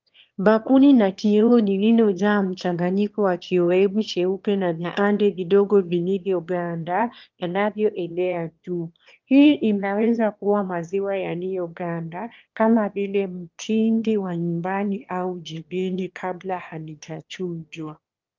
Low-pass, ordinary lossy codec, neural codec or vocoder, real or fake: 7.2 kHz; Opus, 24 kbps; autoencoder, 22.05 kHz, a latent of 192 numbers a frame, VITS, trained on one speaker; fake